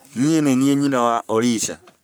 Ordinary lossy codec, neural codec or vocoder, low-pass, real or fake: none; codec, 44.1 kHz, 3.4 kbps, Pupu-Codec; none; fake